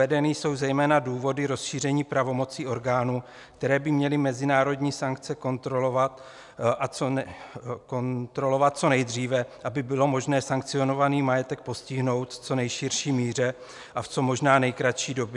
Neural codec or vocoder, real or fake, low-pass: none; real; 10.8 kHz